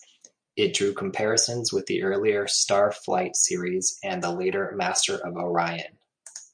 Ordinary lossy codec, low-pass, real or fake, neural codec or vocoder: MP3, 64 kbps; 9.9 kHz; real; none